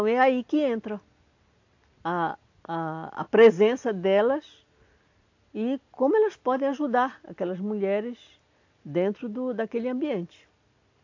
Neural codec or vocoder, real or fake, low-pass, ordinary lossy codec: none; real; 7.2 kHz; AAC, 48 kbps